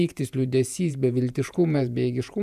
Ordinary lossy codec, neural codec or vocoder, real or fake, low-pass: MP3, 96 kbps; vocoder, 48 kHz, 128 mel bands, Vocos; fake; 14.4 kHz